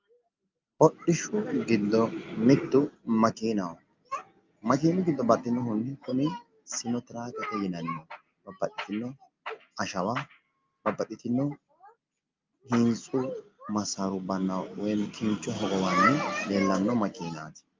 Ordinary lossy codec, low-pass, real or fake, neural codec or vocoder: Opus, 24 kbps; 7.2 kHz; real; none